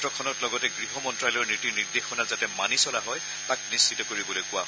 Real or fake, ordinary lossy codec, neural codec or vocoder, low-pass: real; none; none; none